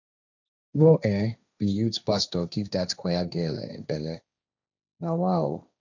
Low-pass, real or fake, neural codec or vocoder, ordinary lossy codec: 7.2 kHz; fake; codec, 16 kHz, 1.1 kbps, Voila-Tokenizer; none